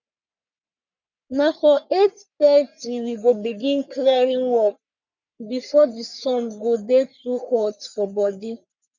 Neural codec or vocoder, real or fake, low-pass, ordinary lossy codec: codec, 44.1 kHz, 3.4 kbps, Pupu-Codec; fake; 7.2 kHz; none